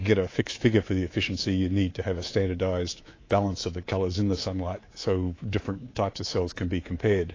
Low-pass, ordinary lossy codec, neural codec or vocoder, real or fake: 7.2 kHz; AAC, 32 kbps; codec, 24 kHz, 3.1 kbps, DualCodec; fake